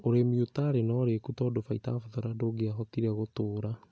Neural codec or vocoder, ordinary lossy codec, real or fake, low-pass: none; none; real; none